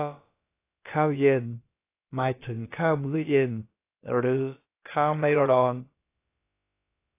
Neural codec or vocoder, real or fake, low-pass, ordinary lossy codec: codec, 16 kHz, about 1 kbps, DyCAST, with the encoder's durations; fake; 3.6 kHz; AAC, 24 kbps